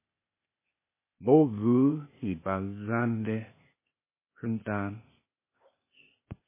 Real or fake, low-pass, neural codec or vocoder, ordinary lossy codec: fake; 3.6 kHz; codec, 16 kHz, 0.8 kbps, ZipCodec; MP3, 16 kbps